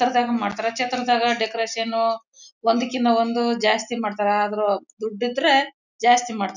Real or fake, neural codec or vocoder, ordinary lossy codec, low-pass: real; none; none; 7.2 kHz